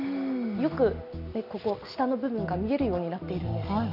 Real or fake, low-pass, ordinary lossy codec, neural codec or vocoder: real; 5.4 kHz; none; none